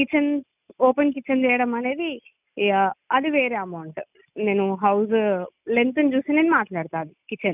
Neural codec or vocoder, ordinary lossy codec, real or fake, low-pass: none; none; real; 3.6 kHz